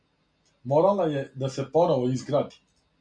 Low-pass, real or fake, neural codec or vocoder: 9.9 kHz; real; none